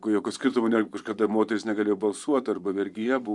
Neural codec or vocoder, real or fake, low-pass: vocoder, 24 kHz, 100 mel bands, Vocos; fake; 10.8 kHz